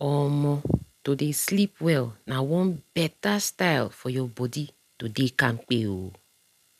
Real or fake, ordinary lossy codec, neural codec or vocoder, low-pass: real; none; none; 14.4 kHz